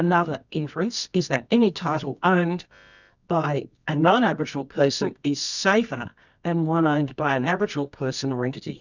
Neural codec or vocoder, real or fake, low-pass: codec, 24 kHz, 0.9 kbps, WavTokenizer, medium music audio release; fake; 7.2 kHz